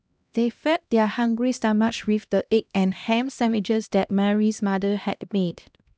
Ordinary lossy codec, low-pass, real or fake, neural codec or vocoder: none; none; fake; codec, 16 kHz, 1 kbps, X-Codec, HuBERT features, trained on LibriSpeech